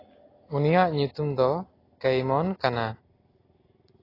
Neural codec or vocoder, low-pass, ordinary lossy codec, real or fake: none; 5.4 kHz; AAC, 24 kbps; real